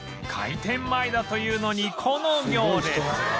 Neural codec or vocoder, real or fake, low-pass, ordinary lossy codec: none; real; none; none